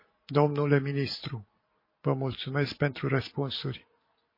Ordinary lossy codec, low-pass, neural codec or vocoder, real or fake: MP3, 24 kbps; 5.4 kHz; none; real